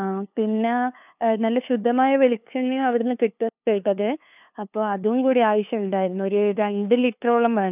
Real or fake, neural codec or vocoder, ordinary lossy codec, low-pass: fake; codec, 16 kHz, 2 kbps, FunCodec, trained on LibriTTS, 25 frames a second; none; 3.6 kHz